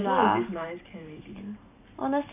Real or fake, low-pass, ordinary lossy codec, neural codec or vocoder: fake; 3.6 kHz; none; vocoder, 44.1 kHz, 128 mel bands every 512 samples, BigVGAN v2